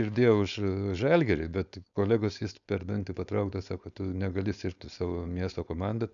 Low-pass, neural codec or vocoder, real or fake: 7.2 kHz; codec, 16 kHz, 4.8 kbps, FACodec; fake